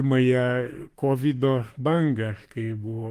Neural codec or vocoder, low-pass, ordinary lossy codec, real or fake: autoencoder, 48 kHz, 32 numbers a frame, DAC-VAE, trained on Japanese speech; 14.4 kHz; Opus, 24 kbps; fake